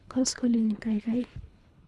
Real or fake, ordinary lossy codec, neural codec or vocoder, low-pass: fake; none; codec, 24 kHz, 3 kbps, HILCodec; none